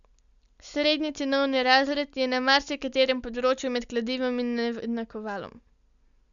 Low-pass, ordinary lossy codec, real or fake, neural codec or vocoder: 7.2 kHz; none; real; none